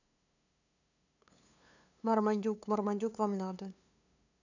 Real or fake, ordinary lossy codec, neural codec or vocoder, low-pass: fake; none; codec, 16 kHz, 2 kbps, FunCodec, trained on LibriTTS, 25 frames a second; 7.2 kHz